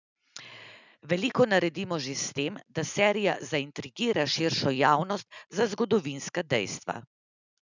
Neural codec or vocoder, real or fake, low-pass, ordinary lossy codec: none; real; 7.2 kHz; none